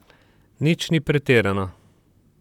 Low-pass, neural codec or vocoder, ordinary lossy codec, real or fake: 19.8 kHz; vocoder, 44.1 kHz, 128 mel bands, Pupu-Vocoder; none; fake